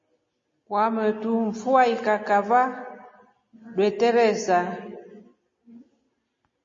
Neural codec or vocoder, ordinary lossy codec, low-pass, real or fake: none; MP3, 32 kbps; 7.2 kHz; real